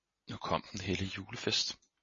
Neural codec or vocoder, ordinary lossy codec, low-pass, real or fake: none; MP3, 32 kbps; 7.2 kHz; real